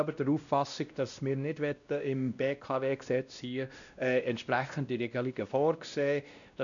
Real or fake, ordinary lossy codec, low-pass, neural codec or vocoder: fake; none; 7.2 kHz; codec, 16 kHz, 1 kbps, X-Codec, WavLM features, trained on Multilingual LibriSpeech